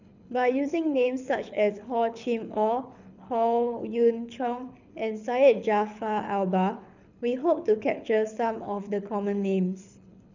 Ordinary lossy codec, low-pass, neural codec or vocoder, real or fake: none; 7.2 kHz; codec, 24 kHz, 6 kbps, HILCodec; fake